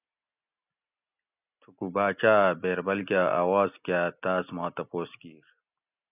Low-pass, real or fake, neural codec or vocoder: 3.6 kHz; real; none